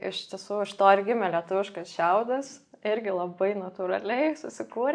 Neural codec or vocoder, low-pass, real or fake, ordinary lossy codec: none; 9.9 kHz; real; AAC, 64 kbps